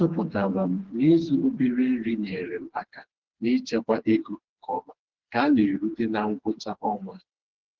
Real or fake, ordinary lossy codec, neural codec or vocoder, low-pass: fake; Opus, 16 kbps; codec, 16 kHz, 2 kbps, FreqCodec, smaller model; 7.2 kHz